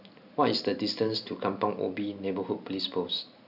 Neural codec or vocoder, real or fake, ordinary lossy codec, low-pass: none; real; none; 5.4 kHz